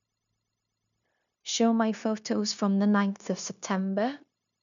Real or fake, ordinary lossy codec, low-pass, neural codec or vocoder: fake; none; 7.2 kHz; codec, 16 kHz, 0.9 kbps, LongCat-Audio-Codec